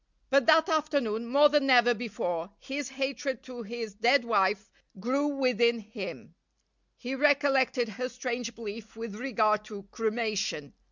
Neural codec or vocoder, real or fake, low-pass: none; real; 7.2 kHz